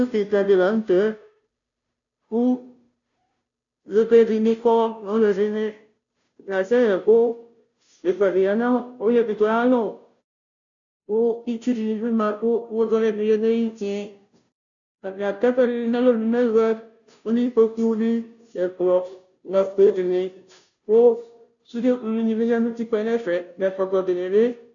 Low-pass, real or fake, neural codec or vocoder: 7.2 kHz; fake; codec, 16 kHz, 0.5 kbps, FunCodec, trained on Chinese and English, 25 frames a second